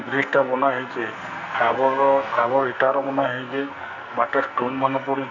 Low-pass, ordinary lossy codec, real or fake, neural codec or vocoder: 7.2 kHz; none; fake; codec, 44.1 kHz, 2.6 kbps, SNAC